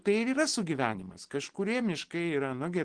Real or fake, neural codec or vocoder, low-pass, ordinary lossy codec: real; none; 9.9 kHz; Opus, 16 kbps